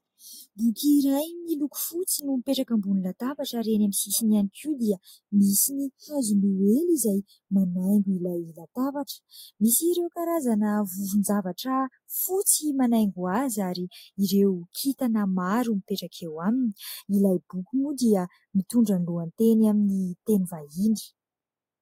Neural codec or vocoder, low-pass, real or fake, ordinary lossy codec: none; 19.8 kHz; real; AAC, 48 kbps